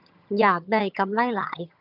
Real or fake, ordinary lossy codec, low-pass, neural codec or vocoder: fake; none; 5.4 kHz; vocoder, 22.05 kHz, 80 mel bands, HiFi-GAN